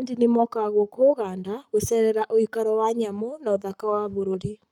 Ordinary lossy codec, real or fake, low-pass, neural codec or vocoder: none; fake; 19.8 kHz; codec, 44.1 kHz, 7.8 kbps, Pupu-Codec